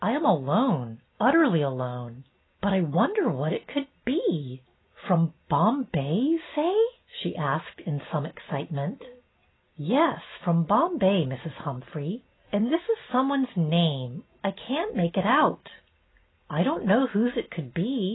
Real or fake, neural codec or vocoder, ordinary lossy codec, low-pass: real; none; AAC, 16 kbps; 7.2 kHz